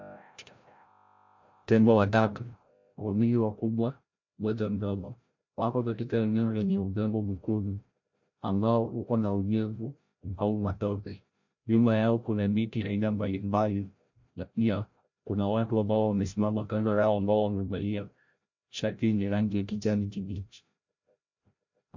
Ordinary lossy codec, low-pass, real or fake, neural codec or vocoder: MP3, 48 kbps; 7.2 kHz; fake; codec, 16 kHz, 0.5 kbps, FreqCodec, larger model